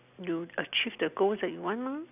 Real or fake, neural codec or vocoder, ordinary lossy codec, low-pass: real; none; none; 3.6 kHz